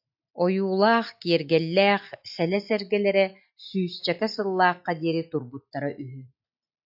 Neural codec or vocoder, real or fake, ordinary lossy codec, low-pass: none; real; AAC, 48 kbps; 5.4 kHz